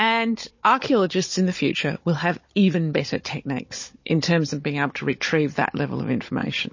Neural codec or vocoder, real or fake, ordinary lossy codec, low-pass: codec, 16 kHz, 16 kbps, FunCodec, trained on Chinese and English, 50 frames a second; fake; MP3, 32 kbps; 7.2 kHz